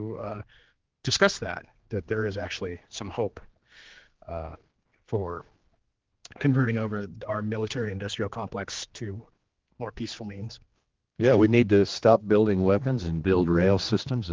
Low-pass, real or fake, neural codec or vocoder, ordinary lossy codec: 7.2 kHz; fake; codec, 16 kHz, 2 kbps, X-Codec, HuBERT features, trained on general audio; Opus, 16 kbps